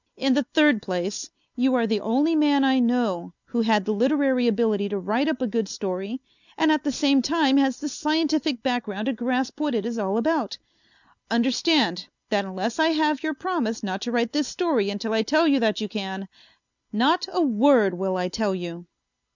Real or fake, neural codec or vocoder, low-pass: real; none; 7.2 kHz